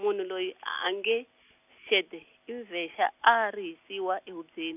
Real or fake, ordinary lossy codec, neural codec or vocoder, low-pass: real; none; none; 3.6 kHz